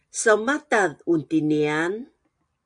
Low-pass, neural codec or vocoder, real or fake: 9.9 kHz; none; real